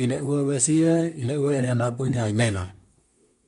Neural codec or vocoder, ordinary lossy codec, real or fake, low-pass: codec, 24 kHz, 1 kbps, SNAC; MP3, 96 kbps; fake; 10.8 kHz